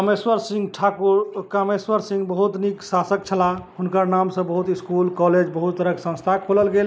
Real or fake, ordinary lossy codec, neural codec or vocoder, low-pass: real; none; none; none